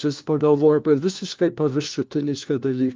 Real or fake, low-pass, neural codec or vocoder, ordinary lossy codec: fake; 7.2 kHz; codec, 16 kHz, 1 kbps, FunCodec, trained on LibriTTS, 50 frames a second; Opus, 24 kbps